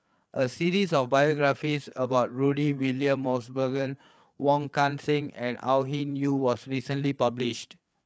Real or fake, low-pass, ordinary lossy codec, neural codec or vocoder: fake; none; none; codec, 16 kHz, 4 kbps, FreqCodec, larger model